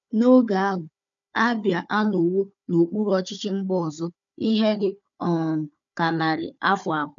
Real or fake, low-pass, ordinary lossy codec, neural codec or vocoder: fake; 7.2 kHz; MP3, 96 kbps; codec, 16 kHz, 4 kbps, FunCodec, trained on Chinese and English, 50 frames a second